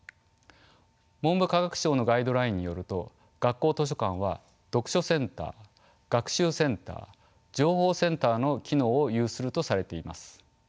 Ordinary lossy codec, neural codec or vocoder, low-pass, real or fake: none; none; none; real